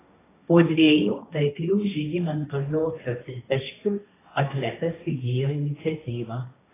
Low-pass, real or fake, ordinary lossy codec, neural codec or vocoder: 3.6 kHz; fake; AAC, 16 kbps; codec, 16 kHz, 1.1 kbps, Voila-Tokenizer